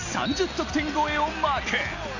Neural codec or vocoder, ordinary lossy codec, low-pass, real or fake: none; none; 7.2 kHz; real